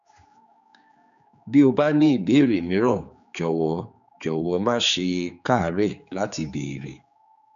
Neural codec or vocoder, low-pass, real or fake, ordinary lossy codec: codec, 16 kHz, 4 kbps, X-Codec, HuBERT features, trained on general audio; 7.2 kHz; fake; none